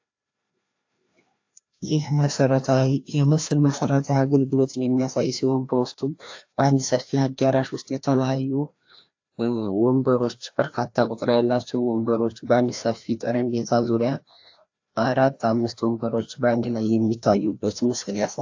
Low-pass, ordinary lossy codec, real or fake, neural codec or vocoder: 7.2 kHz; AAC, 48 kbps; fake; codec, 16 kHz, 1 kbps, FreqCodec, larger model